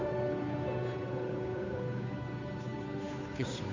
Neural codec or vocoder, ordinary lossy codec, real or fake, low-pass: codec, 16 kHz, 8 kbps, FunCodec, trained on Chinese and English, 25 frames a second; MP3, 64 kbps; fake; 7.2 kHz